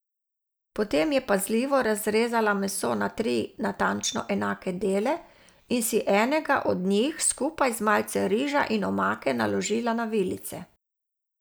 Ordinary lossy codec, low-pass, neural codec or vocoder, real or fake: none; none; none; real